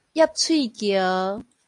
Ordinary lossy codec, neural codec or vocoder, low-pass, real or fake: AAC, 48 kbps; none; 10.8 kHz; real